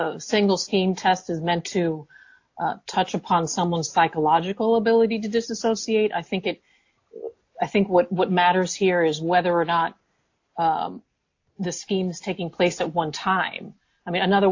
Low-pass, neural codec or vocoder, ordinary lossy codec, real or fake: 7.2 kHz; none; AAC, 48 kbps; real